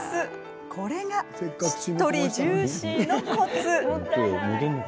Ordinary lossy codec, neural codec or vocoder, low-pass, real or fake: none; none; none; real